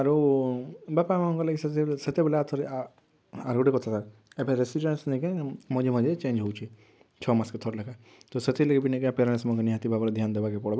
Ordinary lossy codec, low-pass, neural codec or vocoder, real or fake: none; none; none; real